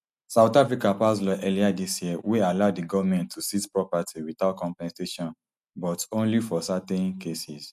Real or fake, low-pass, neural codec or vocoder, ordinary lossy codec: real; 14.4 kHz; none; none